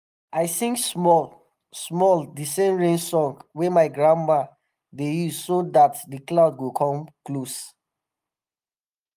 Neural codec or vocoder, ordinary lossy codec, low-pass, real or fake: none; Opus, 32 kbps; 14.4 kHz; real